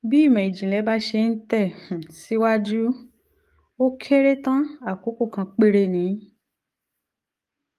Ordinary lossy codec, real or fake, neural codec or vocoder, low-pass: Opus, 32 kbps; fake; codec, 44.1 kHz, 7.8 kbps, DAC; 14.4 kHz